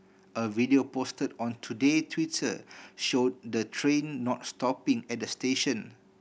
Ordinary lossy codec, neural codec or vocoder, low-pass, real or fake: none; none; none; real